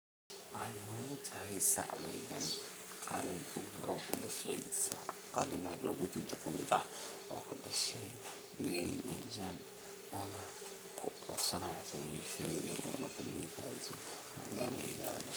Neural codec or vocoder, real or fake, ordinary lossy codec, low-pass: codec, 44.1 kHz, 3.4 kbps, Pupu-Codec; fake; none; none